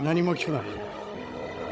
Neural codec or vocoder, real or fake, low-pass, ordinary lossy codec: codec, 16 kHz, 16 kbps, FunCodec, trained on Chinese and English, 50 frames a second; fake; none; none